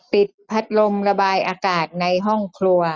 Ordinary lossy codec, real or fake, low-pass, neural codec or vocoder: none; real; none; none